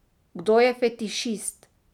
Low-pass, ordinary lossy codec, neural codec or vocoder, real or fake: 19.8 kHz; none; none; real